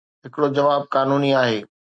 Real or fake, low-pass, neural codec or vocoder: real; 9.9 kHz; none